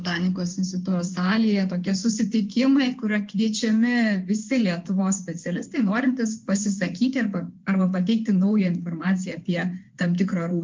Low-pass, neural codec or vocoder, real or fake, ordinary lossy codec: 7.2 kHz; codec, 16 kHz in and 24 kHz out, 2.2 kbps, FireRedTTS-2 codec; fake; Opus, 32 kbps